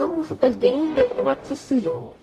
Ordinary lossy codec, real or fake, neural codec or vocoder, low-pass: AAC, 48 kbps; fake; codec, 44.1 kHz, 0.9 kbps, DAC; 14.4 kHz